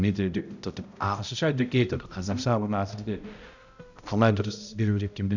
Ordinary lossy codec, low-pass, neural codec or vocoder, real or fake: none; 7.2 kHz; codec, 16 kHz, 0.5 kbps, X-Codec, HuBERT features, trained on balanced general audio; fake